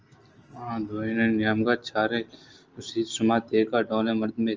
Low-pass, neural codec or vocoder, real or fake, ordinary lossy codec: 7.2 kHz; none; real; Opus, 24 kbps